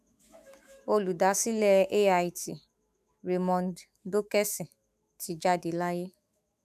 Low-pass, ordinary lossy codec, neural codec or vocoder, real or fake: 14.4 kHz; none; autoencoder, 48 kHz, 128 numbers a frame, DAC-VAE, trained on Japanese speech; fake